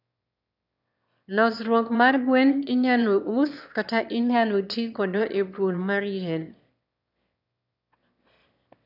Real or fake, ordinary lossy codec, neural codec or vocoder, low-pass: fake; none; autoencoder, 22.05 kHz, a latent of 192 numbers a frame, VITS, trained on one speaker; 5.4 kHz